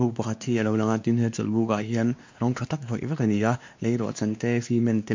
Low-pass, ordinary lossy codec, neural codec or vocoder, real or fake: 7.2 kHz; MP3, 64 kbps; codec, 16 kHz, 2 kbps, X-Codec, WavLM features, trained on Multilingual LibriSpeech; fake